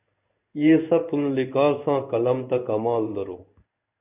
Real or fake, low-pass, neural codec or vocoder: fake; 3.6 kHz; codec, 16 kHz in and 24 kHz out, 1 kbps, XY-Tokenizer